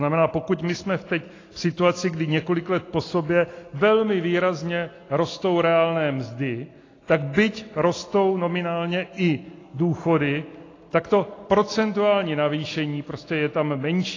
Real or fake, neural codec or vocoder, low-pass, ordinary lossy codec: real; none; 7.2 kHz; AAC, 32 kbps